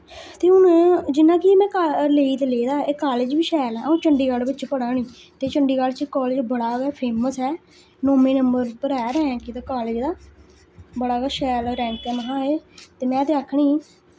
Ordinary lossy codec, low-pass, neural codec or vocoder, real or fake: none; none; none; real